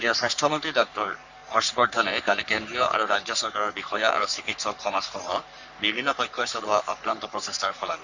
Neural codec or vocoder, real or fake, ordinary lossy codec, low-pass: codec, 44.1 kHz, 3.4 kbps, Pupu-Codec; fake; Opus, 64 kbps; 7.2 kHz